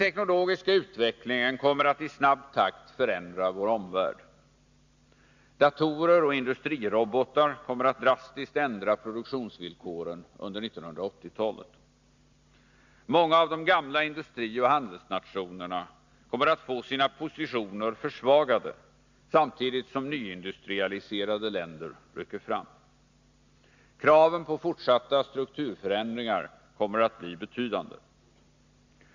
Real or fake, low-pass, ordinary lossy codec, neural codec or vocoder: real; 7.2 kHz; Opus, 64 kbps; none